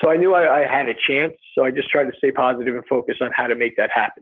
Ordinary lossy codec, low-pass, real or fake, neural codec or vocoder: Opus, 32 kbps; 7.2 kHz; real; none